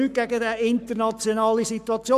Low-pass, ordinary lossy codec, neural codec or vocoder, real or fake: 14.4 kHz; none; codec, 44.1 kHz, 7.8 kbps, Pupu-Codec; fake